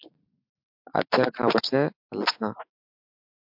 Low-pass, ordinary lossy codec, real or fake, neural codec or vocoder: 5.4 kHz; MP3, 48 kbps; real; none